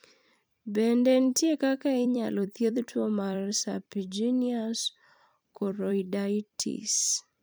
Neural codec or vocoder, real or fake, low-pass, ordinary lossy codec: vocoder, 44.1 kHz, 128 mel bands every 256 samples, BigVGAN v2; fake; none; none